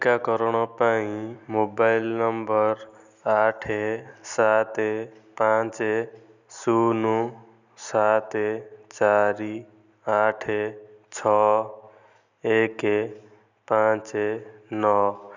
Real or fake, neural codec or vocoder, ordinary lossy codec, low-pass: real; none; none; 7.2 kHz